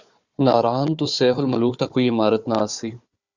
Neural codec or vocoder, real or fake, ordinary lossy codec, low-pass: codec, 16 kHz, 4 kbps, FunCodec, trained on Chinese and English, 50 frames a second; fake; Opus, 64 kbps; 7.2 kHz